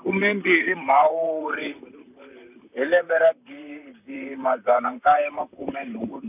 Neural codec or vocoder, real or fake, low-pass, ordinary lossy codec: vocoder, 44.1 kHz, 128 mel bands, Pupu-Vocoder; fake; 3.6 kHz; none